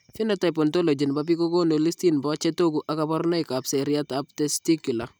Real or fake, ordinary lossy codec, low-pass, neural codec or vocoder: real; none; none; none